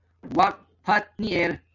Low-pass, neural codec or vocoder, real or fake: 7.2 kHz; none; real